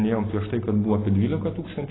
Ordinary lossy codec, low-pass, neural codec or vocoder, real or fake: AAC, 16 kbps; 7.2 kHz; none; real